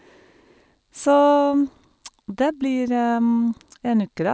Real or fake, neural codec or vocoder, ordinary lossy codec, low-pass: real; none; none; none